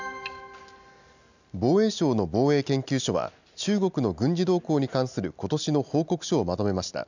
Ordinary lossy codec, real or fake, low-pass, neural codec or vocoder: none; real; 7.2 kHz; none